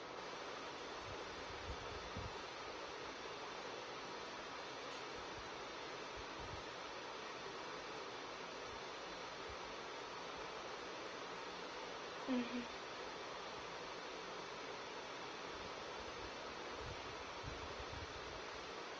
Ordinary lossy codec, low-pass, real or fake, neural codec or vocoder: Opus, 24 kbps; 7.2 kHz; real; none